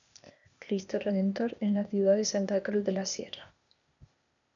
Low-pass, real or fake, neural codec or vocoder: 7.2 kHz; fake; codec, 16 kHz, 0.8 kbps, ZipCodec